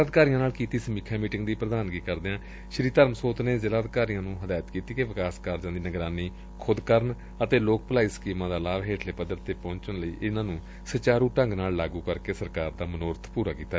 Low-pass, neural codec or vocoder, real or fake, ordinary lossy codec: none; none; real; none